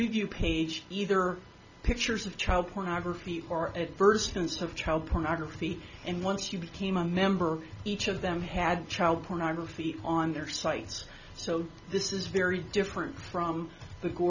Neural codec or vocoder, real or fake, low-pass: none; real; 7.2 kHz